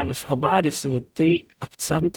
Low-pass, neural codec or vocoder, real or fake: 19.8 kHz; codec, 44.1 kHz, 0.9 kbps, DAC; fake